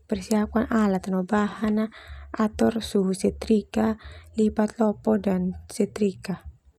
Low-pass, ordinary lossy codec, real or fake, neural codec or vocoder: 19.8 kHz; none; real; none